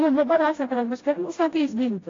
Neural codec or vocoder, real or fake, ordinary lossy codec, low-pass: codec, 16 kHz, 0.5 kbps, FreqCodec, smaller model; fake; AAC, 32 kbps; 7.2 kHz